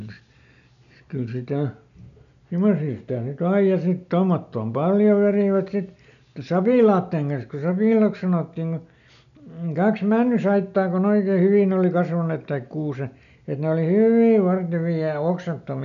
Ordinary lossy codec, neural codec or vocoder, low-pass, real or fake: none; none; 7.2 kHz; real